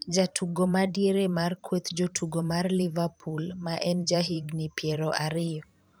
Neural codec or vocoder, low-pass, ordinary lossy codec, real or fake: vocoder, 44.1 kHz, 128 mel bands every 512 samples, BigVGAN v2; none; none; fake